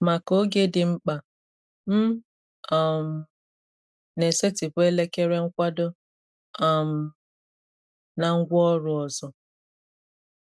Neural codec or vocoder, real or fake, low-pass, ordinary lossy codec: none; real; none; none